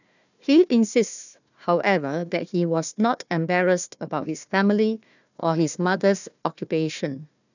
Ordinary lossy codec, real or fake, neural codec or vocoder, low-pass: none; fake; codec, 16 kHz, 1 kbps, FunCodec, trained on Chinese and English, 50 frames a second; 7.2 kHz